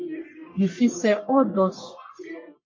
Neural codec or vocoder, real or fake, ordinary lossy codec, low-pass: codec, 44.1 kHz, 1.7 kbps, Pupu-Codec; fake; MP3, 32 kbps; 7.2 kHz